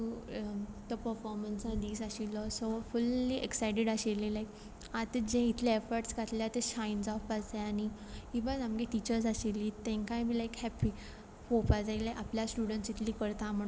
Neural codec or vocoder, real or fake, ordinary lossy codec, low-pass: none; real; none; none